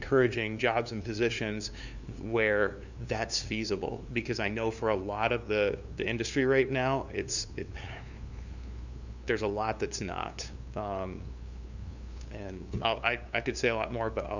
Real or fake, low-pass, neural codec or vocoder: fake; 7.2 kHz; codec, 16 kHz, 2 kbps, FunCodec, trained on LibriTTS, 25 frames a second